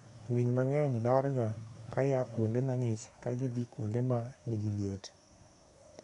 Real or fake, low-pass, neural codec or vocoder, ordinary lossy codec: fake; 10.8 kHz; codec, 24 kHz, 1 kbps, SNAC; none